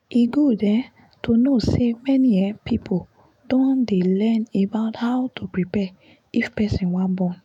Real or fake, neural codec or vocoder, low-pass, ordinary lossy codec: fake; autoencoder, 48 kHz, 128 numbers a frame, DAC-VAE, trained on Japanese speech; 19.8 kHz; none